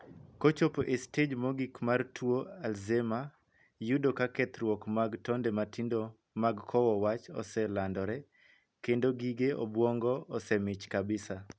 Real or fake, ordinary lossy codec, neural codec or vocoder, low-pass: real; none; none; none